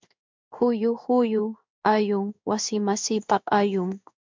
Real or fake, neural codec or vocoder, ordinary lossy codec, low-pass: fake; codec, 16 kHz in and 24 kHz out, 1 kbps, XY-Tokenizer; MP3, 64 kbps; 7.2 kHz